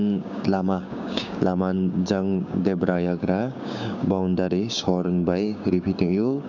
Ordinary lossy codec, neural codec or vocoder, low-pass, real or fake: none; codec, 16 kHz, 6 kbps, DAC; 7.2 kHz; fake